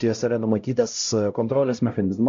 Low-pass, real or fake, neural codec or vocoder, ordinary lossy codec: 7.2 kHz; fake; codec, 16 kHz, 0.5 kbps, X-Codec, HuBERT features, trained on LibriSpeech; MP3, 48 kbps